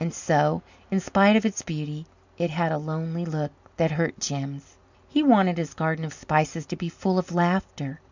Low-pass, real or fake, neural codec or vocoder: 7.2 kHz; real; none